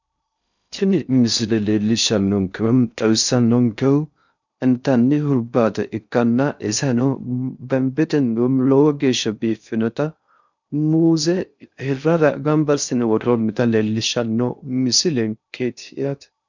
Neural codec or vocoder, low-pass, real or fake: codec, 16 kHz in and 24 kHz out, 0.6 kbps, FocalCodec, streaming, 2048 codes; 7.2 kHz; fake